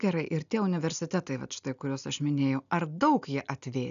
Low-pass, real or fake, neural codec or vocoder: 7.2 kHz; real; none